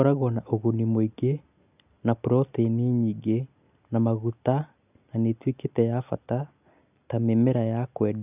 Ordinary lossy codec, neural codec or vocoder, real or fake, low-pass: none; none; real; 3.6 kHz